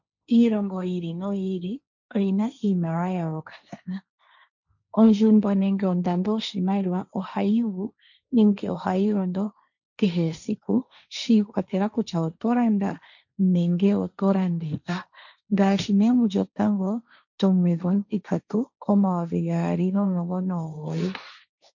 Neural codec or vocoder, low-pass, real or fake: codec, 16 kHz, 1.1 kbps, Voila-Tokenizer; 7.2 kHz; fake